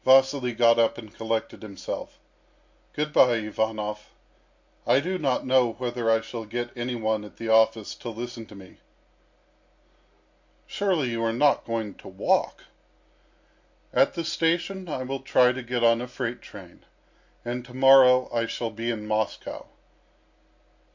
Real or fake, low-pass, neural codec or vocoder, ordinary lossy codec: real; 7.2 kHz; none; MP3, 48 kbps